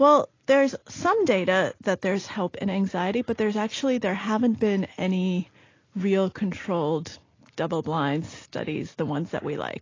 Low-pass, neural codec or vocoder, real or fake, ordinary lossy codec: 7.2 kHz; none; real; AAC, 32 kbps